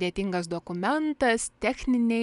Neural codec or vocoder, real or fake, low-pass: none; real; 10.8 kHz